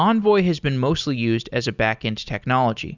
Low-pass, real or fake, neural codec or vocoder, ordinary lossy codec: 7.2 kHz; real; none; Opus, 64 kbps